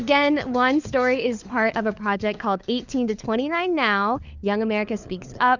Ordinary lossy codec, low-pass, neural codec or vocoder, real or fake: Opus, 64 kbps; 7.2 kHz; codec, 16 kHz, 4 kbps, FunCodec, trained on LibriTTS, 50 frames a second; fake